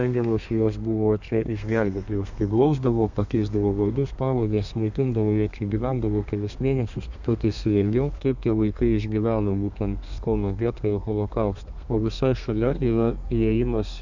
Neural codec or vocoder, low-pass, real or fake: codec, 32 kHz, 1.9 kbps, SNAC; 7.2 kHz; fake